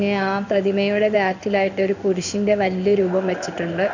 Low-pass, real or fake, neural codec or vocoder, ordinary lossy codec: 7.2 kHz; fake; codec, 16 kHz in and 24 kHz out, 1 kbps, XY-Tokenizer; none